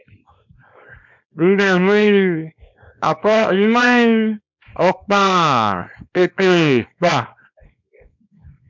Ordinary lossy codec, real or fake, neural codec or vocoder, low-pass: MP3, 64 kbps; fake; codec, 24 kHz, 0.9 kbps, WavTokenizer, small release; 7.2 kHz